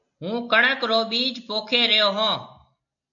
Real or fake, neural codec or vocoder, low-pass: real; none; 7.2 kHz